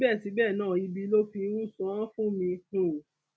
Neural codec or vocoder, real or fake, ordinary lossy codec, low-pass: none; real; none; none